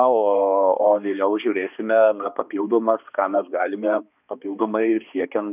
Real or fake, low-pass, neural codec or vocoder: fake; 3.6 kHz; codec, 16 kHz, 2 kbps, X-Codec, HuBERT features, trained on balanced general audio